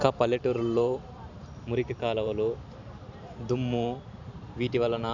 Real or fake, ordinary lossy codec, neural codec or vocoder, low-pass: fake; none; vocoder, 44.1 kHz, 128 mel bands every 512 samples, BigVGAN v2; 7.2 kHz